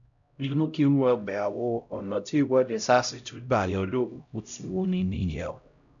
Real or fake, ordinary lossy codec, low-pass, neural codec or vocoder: fake; none; 7.2 kHz; codec, 16 kHz, 0.5 kbps, X-Codec, HuBERT features, trained on LibriSpeech